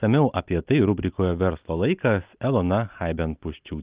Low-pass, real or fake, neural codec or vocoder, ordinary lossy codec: 3.6 kHz; real; none; Opus, 32 kbps